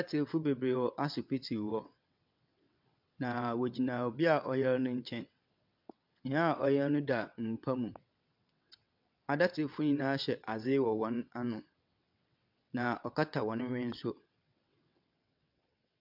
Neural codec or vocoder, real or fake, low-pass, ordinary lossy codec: vocoder, 22.05 kHz, 80 mel bands, WaveNeXt; fake; 5.4 kHz; MP3, 48 kbps